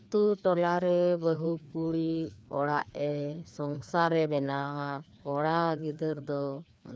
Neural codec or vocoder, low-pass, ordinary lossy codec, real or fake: codec, 16 kHz, 2 kbps, FreqCodec, larger model; none; none; fake